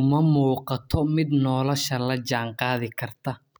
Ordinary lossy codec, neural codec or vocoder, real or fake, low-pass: none; none; real; none